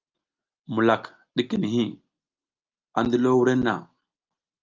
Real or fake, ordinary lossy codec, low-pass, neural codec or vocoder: real; Opus, 24 kbps; 7.2 kHz; none